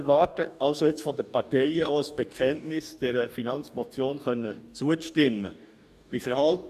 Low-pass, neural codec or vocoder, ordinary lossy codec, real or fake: 14.4 kHz; codec, 44.1 kHz, 2.6 kbps, DAC; none; fake